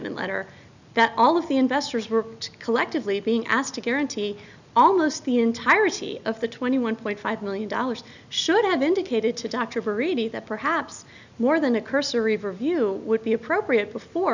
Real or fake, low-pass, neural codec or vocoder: real; 7.2 kHz; none